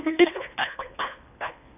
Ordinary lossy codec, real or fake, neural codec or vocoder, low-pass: none; fake; codec, 24 kHz, 1.5 kbps, HILCodec; 3.6 kHz